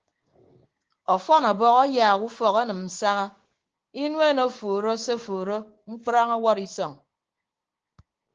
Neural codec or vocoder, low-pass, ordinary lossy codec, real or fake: codec, 16 kHz, 6 kbps, DAC; 7.2 kHz; Opus, 16 kbps; fake